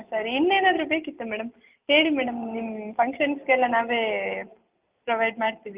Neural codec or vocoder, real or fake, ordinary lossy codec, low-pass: none; real; Opus, 24 kbps; 3.6 kHz